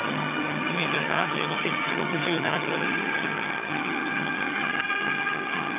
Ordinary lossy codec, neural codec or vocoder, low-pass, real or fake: AAC, 24 kbps; vocoder, 22.05 kHz, 80 mel bands, HiFi-GAN; 3.6 kHz; fake